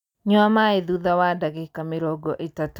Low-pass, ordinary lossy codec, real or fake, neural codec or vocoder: 19.8 kHz; none; real; none